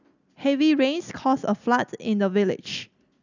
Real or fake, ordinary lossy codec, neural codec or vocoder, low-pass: real; none; none; 7.2 kHz